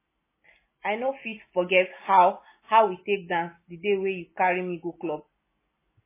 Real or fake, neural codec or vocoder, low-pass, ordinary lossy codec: real; none; 3.6 kHz; MP3, 16 kbps